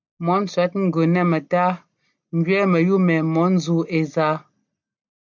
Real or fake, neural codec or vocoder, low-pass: real; none; 7.2 kHz